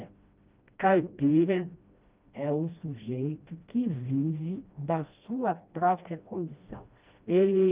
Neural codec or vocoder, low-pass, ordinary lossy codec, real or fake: codec, 16 kHz, 1 kbps, FreqCodec, smaller model; 3.6 kHz; Opus, 24 kbps; fake